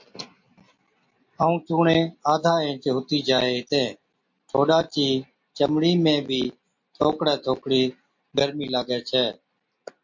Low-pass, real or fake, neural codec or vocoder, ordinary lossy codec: 7.2 kHz; real; none; MP3, 48 kbps